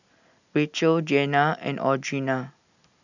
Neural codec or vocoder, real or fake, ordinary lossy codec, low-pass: none; real; none; 7.2 kHz